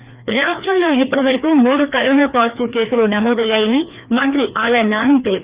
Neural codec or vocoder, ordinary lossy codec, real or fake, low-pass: codec, 16 kHz, 2 kbps, FreqCodec, larger model; Opus, 64 kbps; fake; 3.6 kHz